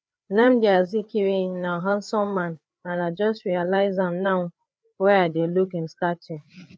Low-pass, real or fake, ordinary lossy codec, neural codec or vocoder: none; fake; none; codec, 16 kHz, 4 kbps, FreqCodec, larger model